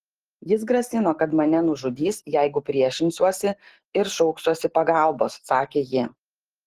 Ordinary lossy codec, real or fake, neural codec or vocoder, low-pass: Opus, 16 kbps; real; none; 14.4 kHz